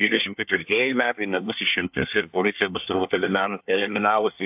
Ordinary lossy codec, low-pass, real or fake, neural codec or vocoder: AAC, 32 kbps; 3.6 kHz; fake; codec, 24 kHz, 1 kbps, SNAC